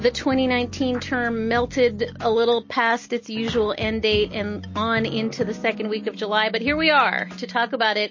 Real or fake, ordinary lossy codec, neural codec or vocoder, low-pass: real; MP3, 32 kbps; none; 7.2 kHz